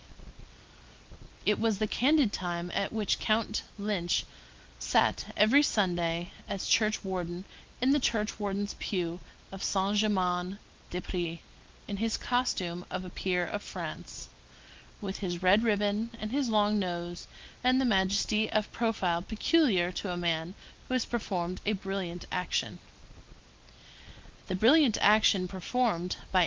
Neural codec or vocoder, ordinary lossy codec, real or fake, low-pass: none; Opus, 32 kbps; real; 7.2 kHz